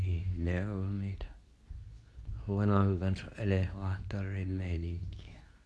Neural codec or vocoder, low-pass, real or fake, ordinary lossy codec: codec, 24 kHz, 0.9 kbps, WavTokenizer, medium speech release version 2; none; fake; none